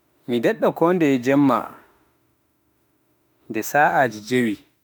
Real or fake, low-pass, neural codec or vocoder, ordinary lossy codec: fake; none; autoencoder, 48 kHz, 32 numbers a frame, DAC-VAE, trained on Japanese speech; none